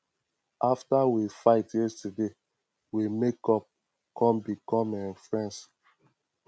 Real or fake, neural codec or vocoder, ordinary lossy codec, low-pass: real; none; none; none